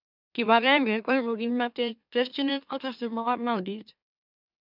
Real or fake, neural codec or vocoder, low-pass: fake; autoencoder, 44.1 kHz, a latent of 192 numbers a frame, MeloTTS; 5.4 kHz